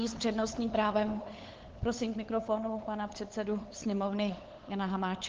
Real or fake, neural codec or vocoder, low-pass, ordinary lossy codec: fake; codec, 16 kHz, 8 kbps, FunCodec, trained on LibriTTS, 25 frames a second; 7.2 kHz; Opus, 16 kbps